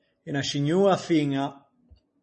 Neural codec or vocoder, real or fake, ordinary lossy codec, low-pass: none; real; MP3, 32 kbps; 10.8 kHz